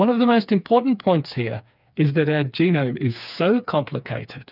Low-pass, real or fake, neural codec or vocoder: 5.4 kHz; fake; codec, 16 kHz, 4 kbps, FreqCodec, smaller model